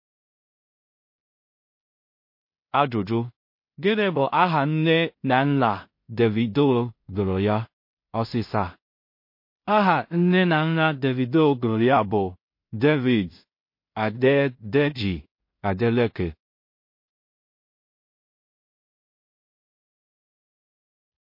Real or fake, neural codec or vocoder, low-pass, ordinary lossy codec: fake; codec, 16 kHz in and 24 kHz out, 0.4 kbps, LongCat-Audio-Codec, two codebook decoder; 5.4 kHz; MP3, 32 kbps